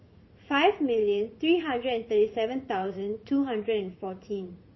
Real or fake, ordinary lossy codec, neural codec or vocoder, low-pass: fake; MP3, 24 kbps; vocoder, 44.1 kHz, 80 mel bands, Vocos; 7.2 kHz